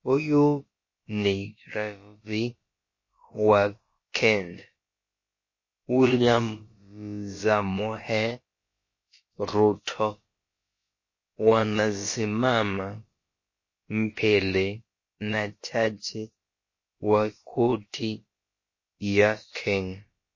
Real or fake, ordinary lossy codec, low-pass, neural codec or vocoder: fake; MP3, 32 kbps; 7.2 kHz; codec, 16 kHz, about 1 kbps, DyCAST, with the encoder's durations